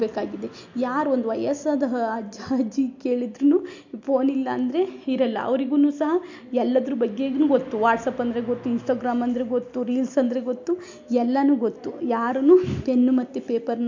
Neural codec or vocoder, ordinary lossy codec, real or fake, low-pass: none; MP3, 48 kbps; real; 7.2 kHz